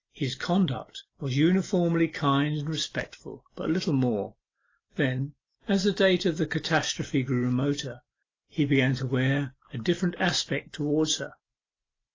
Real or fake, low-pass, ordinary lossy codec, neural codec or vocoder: real; 7.2 kHz; AAC, 32 kbps; none